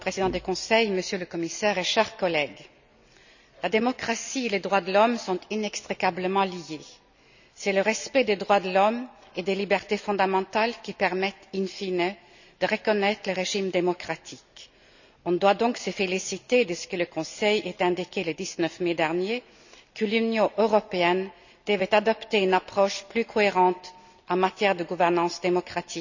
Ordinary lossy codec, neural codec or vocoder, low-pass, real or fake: none; none; 7.2 kHz; real